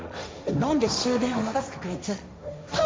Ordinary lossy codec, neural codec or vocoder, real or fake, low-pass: none; codec, 16 kHz, 1.1 kbps, Voila-Tokenizer; fake; none